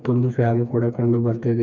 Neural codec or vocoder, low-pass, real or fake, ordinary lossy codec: codec, 16 kHz, 2 kbps, FreqCodec, smaller model; 7.2 kHz; fake; none